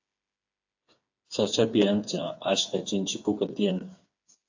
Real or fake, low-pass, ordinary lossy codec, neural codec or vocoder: fake; 7.2 kHz; AAC, 48 kbps; codec, 16 kHz, 4 kbps, FreqCodec, smaller model